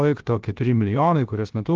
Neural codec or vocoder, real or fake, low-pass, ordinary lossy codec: codec, 16 kHz, 0.7 kbps, FocalCodec; fake; 7.2 kHz; Opus, 16 kbps